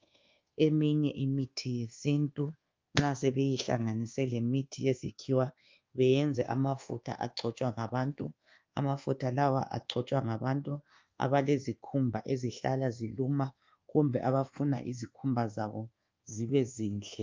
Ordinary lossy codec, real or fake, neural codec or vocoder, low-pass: Opus, 24 kbps; fake; codec, 24 kHz, 1.2 kbps, DualCodec; 7.2 kHz